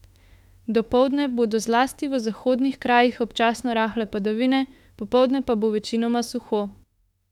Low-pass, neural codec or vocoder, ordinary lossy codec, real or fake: 19.8 kHz; autoencoder, 48 kHz, 32 numbers a frame, DAC-VAE, trained on Japanese speech; none; fake